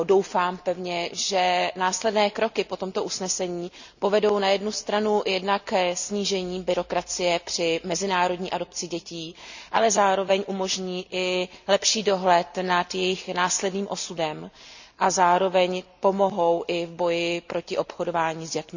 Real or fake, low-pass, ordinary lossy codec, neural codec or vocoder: real; 7.2 kHz; none; none